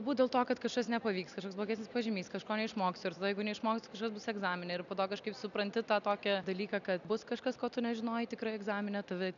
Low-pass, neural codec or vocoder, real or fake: 7.2 kHz; none; real